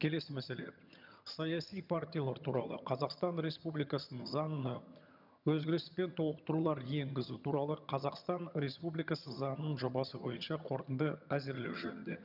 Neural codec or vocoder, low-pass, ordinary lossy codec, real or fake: vocoder, 22.05 kHz, 80 mel bands, HiFi-GAN; 5.4 kHz; none; fake